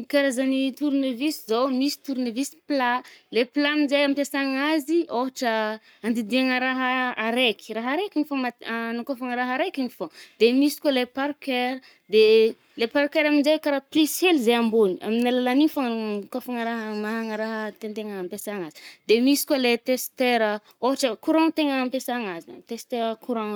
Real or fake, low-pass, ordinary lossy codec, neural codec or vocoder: fake; none; none; codec, 44.1 kHz, 7.8 kbps, Pupu-Codec